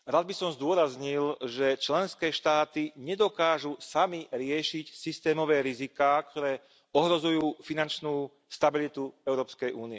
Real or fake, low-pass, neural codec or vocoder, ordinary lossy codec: real; none; none; none